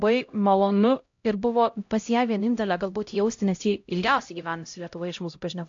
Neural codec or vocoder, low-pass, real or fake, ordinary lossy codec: codec, 16 kHz, 0.5 kbps, X-Codec, HuBERT features, trained on LibriSpeech; 7.2 kHz; fake; AAC, 48 kbps